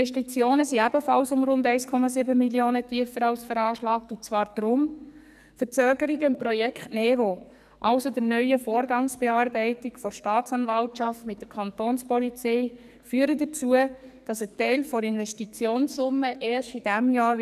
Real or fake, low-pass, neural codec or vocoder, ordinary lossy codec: fake; 14.4 kHz; codec, 44.1 kHz, 2.6 kbps, SNAC; none